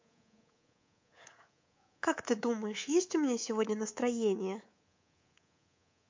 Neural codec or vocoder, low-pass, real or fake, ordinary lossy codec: codec, 24 kHz, 3.1 kbps, DualCodec; 7.2 kHz; fake; MP3, 48 kbps